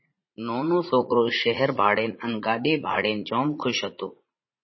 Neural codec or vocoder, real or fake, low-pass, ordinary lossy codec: vocoder, 44.1 kHz, 80 mel bands, Vocos; fake; 7.2 kHz; MP3, 24 kbps